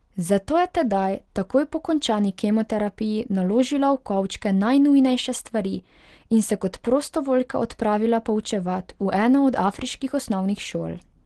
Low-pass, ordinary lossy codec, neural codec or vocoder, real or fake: 10.8 kHz; Opus, 16 kbps; none; real